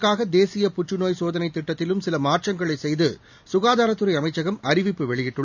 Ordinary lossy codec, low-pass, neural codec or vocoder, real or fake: none; 7.2 kHz; none; real